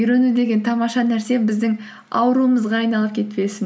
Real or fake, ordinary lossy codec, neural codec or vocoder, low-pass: real; none; none; none